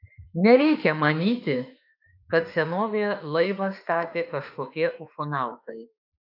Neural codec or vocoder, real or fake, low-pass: autoencoder, 48 kHz, 32 numbers a frame, DAC-VAE, trained on Japanese speech; fake; 5.4 kHz